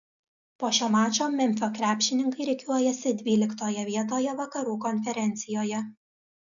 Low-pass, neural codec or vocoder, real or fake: 7.2 kHz; none; real